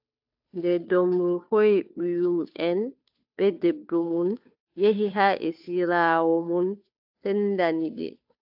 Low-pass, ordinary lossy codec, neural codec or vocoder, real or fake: 5.4 kHz; AAC, 48 kbps; codec, 16 kHz, 2 kbps, FunCodec, trained on Chinese and English, 25 frames a second; fake